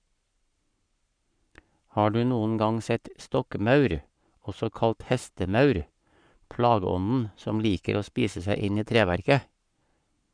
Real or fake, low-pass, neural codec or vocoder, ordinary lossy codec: fake; 9.9 kHz; codec, 44.1 kHz, 7.8 kbps, Pupu-Codec; none